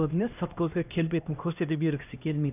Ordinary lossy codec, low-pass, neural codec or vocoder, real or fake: none; 3.6 kHz; codec, 16 kHz, 1 kbps, X-Codec, HuBERT features, trained on LibriSpeech; fake